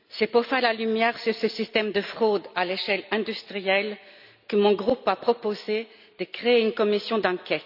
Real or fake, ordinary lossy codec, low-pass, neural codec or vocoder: real; none; 5.4 kHz; none